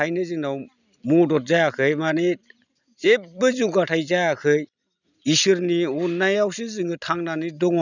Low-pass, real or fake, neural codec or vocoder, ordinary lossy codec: 7.2 kHz; real; none; none